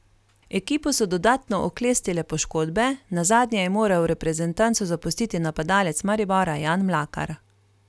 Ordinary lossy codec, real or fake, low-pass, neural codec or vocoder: none; real; none; none